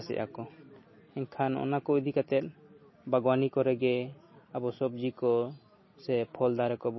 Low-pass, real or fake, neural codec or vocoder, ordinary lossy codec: 7.2 kHz; real; none; MP3, 24 kbps